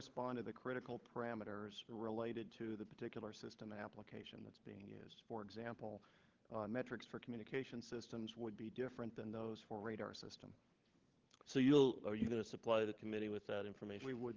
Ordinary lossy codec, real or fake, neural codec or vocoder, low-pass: Opus, 16 kbps; fake; codec, 16 kHz, 16 kbps, FunCodec, trained on LibriTTS, 50 frames a second; 7.2 kHz